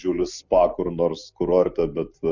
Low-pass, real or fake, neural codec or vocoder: 7.2 kHz; real; none